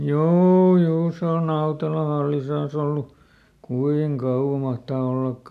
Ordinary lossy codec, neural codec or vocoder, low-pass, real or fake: none; none; 14.4 kHz; real